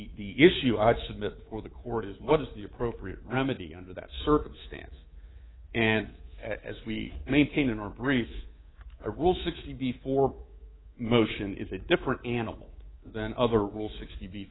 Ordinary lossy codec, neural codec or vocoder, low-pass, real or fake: AAC, 16 kbps; codec, 24 kHz, 3.1 kbps, DualCodec; 7.2 kHz; fake